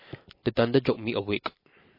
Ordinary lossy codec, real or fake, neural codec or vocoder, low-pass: MP3, 24 kbps; real; none; 5.4 kHz